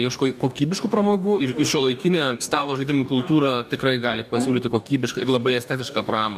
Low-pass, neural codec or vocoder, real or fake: 14.4 kHz; codec, 44.1 kHz, 2.6 kbps, DAC; fake